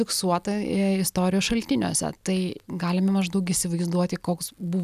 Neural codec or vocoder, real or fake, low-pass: none; real; 14.4 kHz